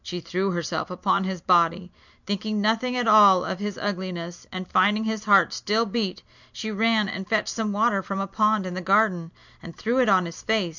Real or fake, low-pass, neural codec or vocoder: real; 7.2 kHz; none